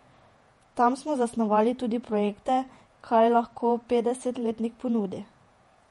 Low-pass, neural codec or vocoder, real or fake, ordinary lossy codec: 19.8 kHz; vocoder, 48 kHz, 128 mel bands, Vocos; fake; MP3, 48 kbps